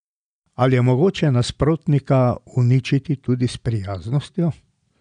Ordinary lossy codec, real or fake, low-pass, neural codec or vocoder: none; real; 9.9 kHz; none